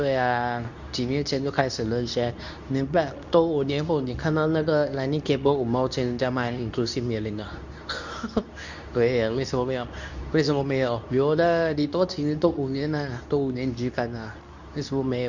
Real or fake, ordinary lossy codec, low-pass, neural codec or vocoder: fake; none; 7.2 kHz; codec, 24 kHz, 0.9 kbps, WavTokenizer, medium speech release version 2